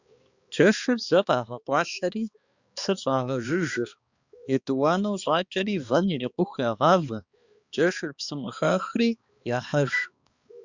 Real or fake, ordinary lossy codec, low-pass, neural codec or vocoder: fake; Opus, 64 kbps; 7.2 kHz; codec, 16 kHz, 2 kbps, X-Codec, HuBERT features, trained on balanced general audio